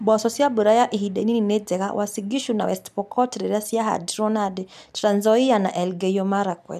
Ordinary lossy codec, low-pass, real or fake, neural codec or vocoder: none; 14.4 kHz; real; none